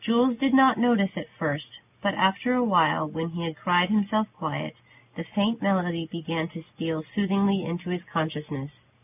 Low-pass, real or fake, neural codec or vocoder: 3.6 kHz; real; none